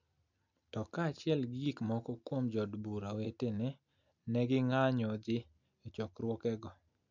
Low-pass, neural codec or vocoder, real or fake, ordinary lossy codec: 7.2 kHz; none; real; none